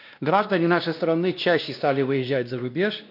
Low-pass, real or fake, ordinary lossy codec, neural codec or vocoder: 5.4 kHz; fake; none; codec, 16 kHz, 1 kbps, X-Codec, WavLM features, trained on Multilingual LibriSpeech